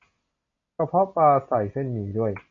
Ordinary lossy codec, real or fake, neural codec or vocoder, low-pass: MP3, 48 kbps; real; none; 7.2 kHz